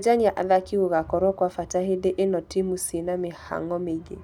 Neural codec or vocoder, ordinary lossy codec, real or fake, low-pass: none; none; real; 19.8 kHz